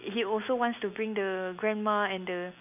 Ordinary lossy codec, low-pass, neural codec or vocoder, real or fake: none; 3.6 kHz; none; real